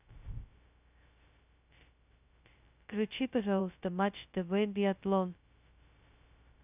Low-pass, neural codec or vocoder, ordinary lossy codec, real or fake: 3.6 kHz; codec, 16 kHz, 0.2 kbps, FocalCodec; none; fake